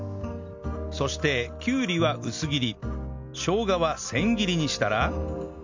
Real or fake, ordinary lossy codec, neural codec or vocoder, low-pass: real; MP3, 64 kbps; none; 7.2 kHz